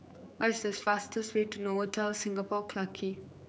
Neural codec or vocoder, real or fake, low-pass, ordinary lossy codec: codec, 16 kHz, 4 kbps, X-Codec, HuBERT features, trained on general audio; fake; none; none